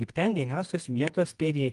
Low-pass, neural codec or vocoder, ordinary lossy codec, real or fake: 10.8 kHz; codec, 24 kHz, 0.9 kbps, WavTokenizer, medium music audio release; Opus, 32 kbps; fake